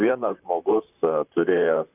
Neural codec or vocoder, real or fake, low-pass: vocoder, 44.1 kHz, 128 mel bands, Pupu-Vocoder; fake; 3.6 kHz